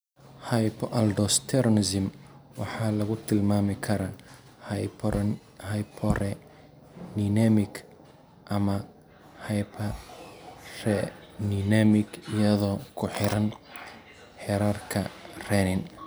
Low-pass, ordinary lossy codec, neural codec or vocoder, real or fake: none; none; none; real